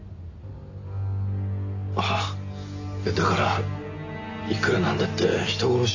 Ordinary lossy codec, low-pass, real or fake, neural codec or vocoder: none; 7.2 kHz; real; none